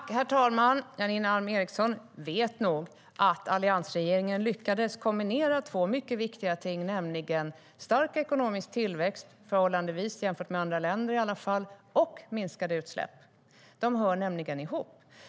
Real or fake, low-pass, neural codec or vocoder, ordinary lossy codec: real; none; none; none